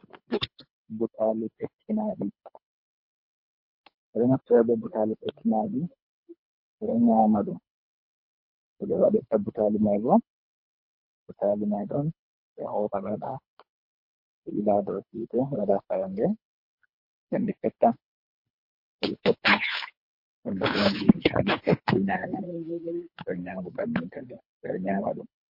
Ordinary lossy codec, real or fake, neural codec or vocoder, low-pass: MP3, 32 kbps; fake; codec, 24 kHz, 3 kbps, HILCodec; 5.4 kHz